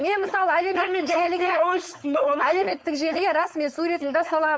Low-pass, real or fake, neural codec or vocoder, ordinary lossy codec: none; fake; codec, 16 kHz, 4.8 kbps, FACodec; none